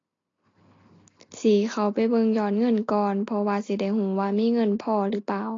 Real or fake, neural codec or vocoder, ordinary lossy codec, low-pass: real; none; AAC, 32 kbps; 7.2 kHz